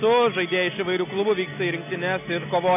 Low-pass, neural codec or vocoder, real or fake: 3.6 kHz; none; real